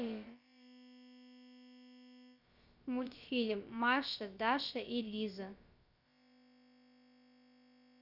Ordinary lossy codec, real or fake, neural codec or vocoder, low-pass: none; fake; codec, 16 kHz, about 1 kbps, DyCAST, with the encoder's durations; 5.4 kHz